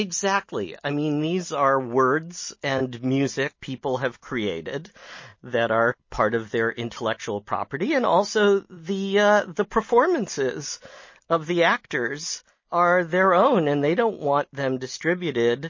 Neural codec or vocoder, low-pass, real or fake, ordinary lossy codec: none; 7.2 kHz; real; MP3, 32 kbps